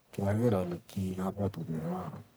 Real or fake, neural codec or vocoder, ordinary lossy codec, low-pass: fake; codec, 44.1 kHz, 1.7 kbps, Pupu-Codec; none; none